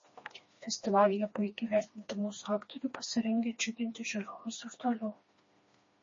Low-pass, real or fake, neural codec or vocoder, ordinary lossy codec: 7.2 kHz; fake; codec, 16 kHz, 2 kbps, FreqCodec, smaller model; MP3, 32 kbps